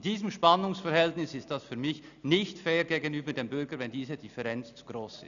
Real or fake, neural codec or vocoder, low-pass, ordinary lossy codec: real; none; 7.2 kHz; none